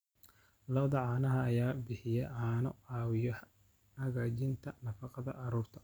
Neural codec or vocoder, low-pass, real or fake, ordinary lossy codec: none; none; real; none